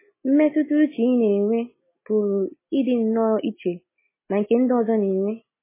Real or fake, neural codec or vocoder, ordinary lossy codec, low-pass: real; none; MP3, 16 kbps; 3.6 kHz